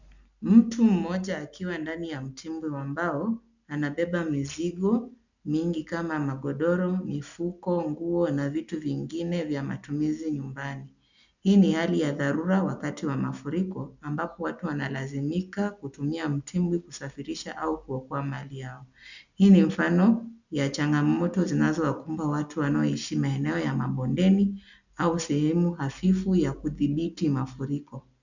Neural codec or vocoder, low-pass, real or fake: none; 7.2 kHz; real